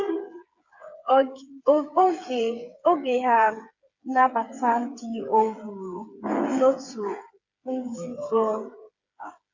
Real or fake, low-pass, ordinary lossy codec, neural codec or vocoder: fake; 7.2 kHz; Opus, 64 kbps; codec, 16 kHz, 8 kbps, FreqCodec, smaller model